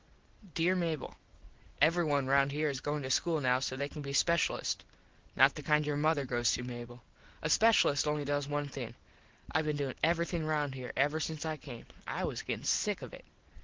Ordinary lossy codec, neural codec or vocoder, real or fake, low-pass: Opus, 16 kbps; none; real; 7.2 kHz